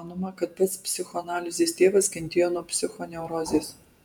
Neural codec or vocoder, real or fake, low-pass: vocoder, 48 kHz, 128 mel bands, Vocos; fake; 19.8 kHz